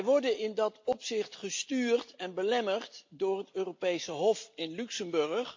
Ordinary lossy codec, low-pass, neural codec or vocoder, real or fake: MP3, 48 kbps; 7.2 kHz; none; real